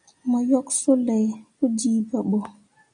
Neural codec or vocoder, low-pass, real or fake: none; 9.9 kHz; real